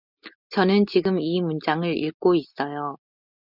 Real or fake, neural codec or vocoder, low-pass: real; none; 5.4 kHz